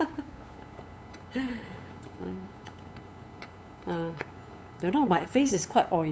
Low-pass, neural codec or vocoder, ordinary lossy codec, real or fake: none; codec, 16 kHz, 8 kbps, FunCodec, trained on LibriTTS, 25 frames a second; none; fake